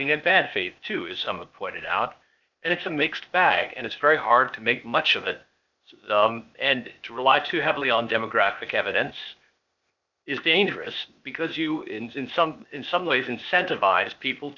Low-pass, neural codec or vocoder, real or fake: 7.2 kHz; codec, 16 kHz, 0.8 kbps, ZipCodec; fake